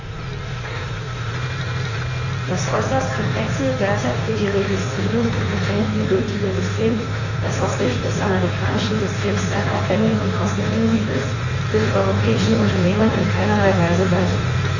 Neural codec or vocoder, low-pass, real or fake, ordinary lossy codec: codec, 16 kHz in and 24 kHz out, 1.1 kbps, FireRedTTS-2 codec; 7.2 kHz; fake; none